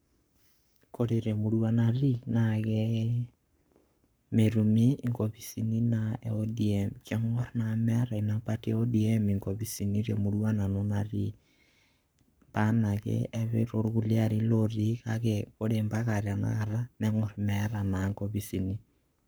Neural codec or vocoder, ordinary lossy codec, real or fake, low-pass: codec, 44.1 kHz, 7.8 kbps, Pupu-Codec; none; fake; none